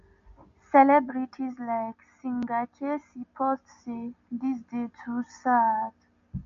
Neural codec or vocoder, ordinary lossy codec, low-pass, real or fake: none; MP3, 48 kbps; 7.2 kHz; real